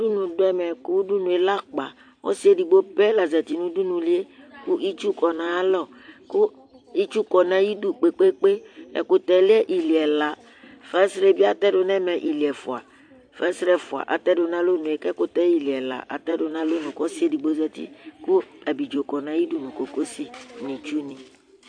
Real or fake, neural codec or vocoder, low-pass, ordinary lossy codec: fake; vocoder, 44.1 kHz, 128 mel bands every 256 samples, BigVGAN v2; 9.9 kHz; AAC, 64 kbps